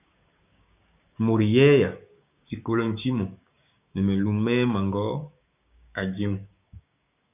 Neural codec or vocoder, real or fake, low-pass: codec, 16 kHz, 6 kbps, DAC; fake; 3.6 kHz